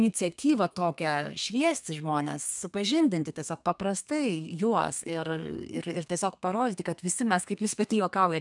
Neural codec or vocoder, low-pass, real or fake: codec, 32 kHz, 1.9 kbps, SNAC; 10.8 kHz; fake